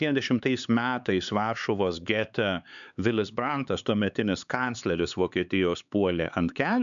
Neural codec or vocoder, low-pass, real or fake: codec, 16 kHz, 4 kbps, X-Codec, HuBERT features, trained on LibriSpeech; 7.2 kHz; fake